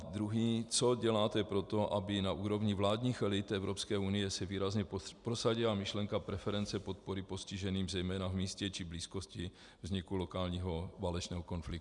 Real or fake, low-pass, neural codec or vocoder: real; 10.8 kHz; none